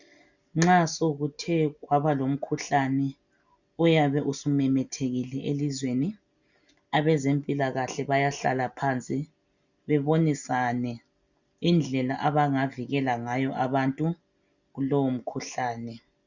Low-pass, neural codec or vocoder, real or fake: 7.2 kHz; none; real